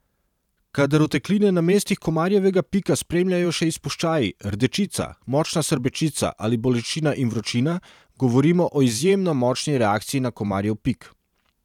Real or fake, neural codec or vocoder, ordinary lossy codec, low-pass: fake; vocoder, 44.1 kHz, 128 mel bands every 512 samples, BigVGAN v2; none; 19.8 kHz